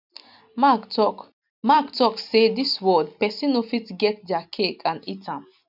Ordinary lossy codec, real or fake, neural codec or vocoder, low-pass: none; real; none; 5.4 kHz